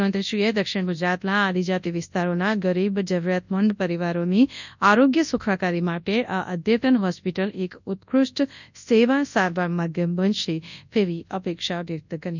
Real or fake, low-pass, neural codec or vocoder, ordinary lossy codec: fake; 7.2 kHz; codec, 24 kHz, 0.9 kbps, WavTokenizer, large speech release; none